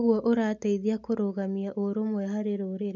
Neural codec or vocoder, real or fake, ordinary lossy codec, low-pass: none; real; none; 7.2 kHz